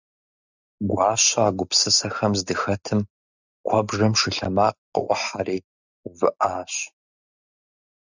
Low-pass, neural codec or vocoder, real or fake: 7.2 kHz; none; real